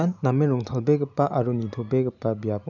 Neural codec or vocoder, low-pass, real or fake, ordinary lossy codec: none; 7.2 kHz; real; none